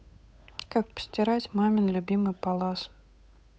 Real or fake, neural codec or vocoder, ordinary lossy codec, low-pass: fake; codec, 16 kHz, 8 kbps, FunCodec, trained on Chinese and English, 25 frames a second; none; none